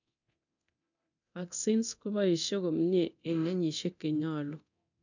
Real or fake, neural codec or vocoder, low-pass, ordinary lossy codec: fake; codec, 24 kHz, 0.9 kbps, DualCodec; 7.2 kHz; none